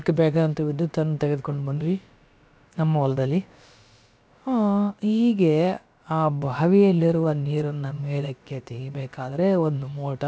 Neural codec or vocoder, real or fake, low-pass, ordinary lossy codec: codec, 16 kHz, about 1 kbps, DyCAST, with the encoder's durations; fake; none; none